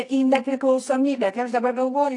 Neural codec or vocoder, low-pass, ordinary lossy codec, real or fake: codec, 24 kHz, 0.9 kbps, WavTokenizer, medium music audio release; 10.8 kHz; AAC, 48 kbps; fake